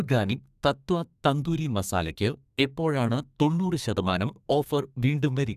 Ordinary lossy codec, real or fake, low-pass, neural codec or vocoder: none; fake; 14.4 kHz; codec, 32 kHz, 1.9 kbps, SNAC